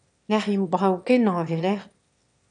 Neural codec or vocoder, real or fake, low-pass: autoencoder, 22.05 kHz, a latent of 192 numbers a frame, VITS, trained on one speaker; fake; 9.9 kHz